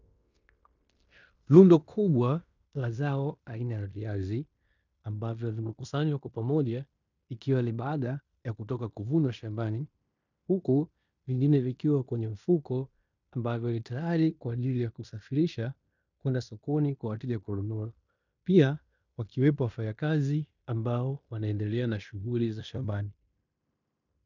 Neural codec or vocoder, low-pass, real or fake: codec, 16 kHz in and 24 kHz out, 0.9 kbps, LongCat-Audio-Codec, fine tuned four codebook decoder; 7.2 kHz; fake